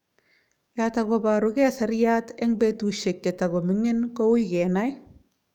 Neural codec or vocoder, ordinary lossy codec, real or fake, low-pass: codec, 44.1 kHz, 7.8 kbps, DAC; none; fake; 19.8 kHz